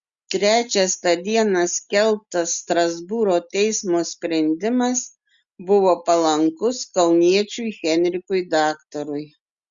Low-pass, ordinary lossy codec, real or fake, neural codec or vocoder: 7.2 kHz; Opus, 64 kbps; real; none